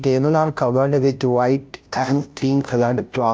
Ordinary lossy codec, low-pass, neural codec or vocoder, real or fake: none; none; codec, 16 kHz, 0.5 kbps, FunCodec, trained on Chinese and English, 25 frames a second; fake